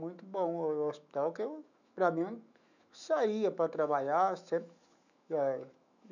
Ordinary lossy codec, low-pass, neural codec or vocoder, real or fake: none; 7.2 kHz; autoencoder, 48 kHz, 128 numbers a frame, DAC-VAE, trained on Japanese speech; fake